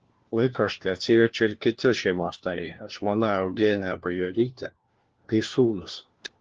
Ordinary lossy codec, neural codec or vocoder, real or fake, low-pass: Opus, 16 kbps; codec, 16 kHz, 1 kbps, FunCodec, trained on LibriTTS, 50 frames a second; fake; 7.2 kHz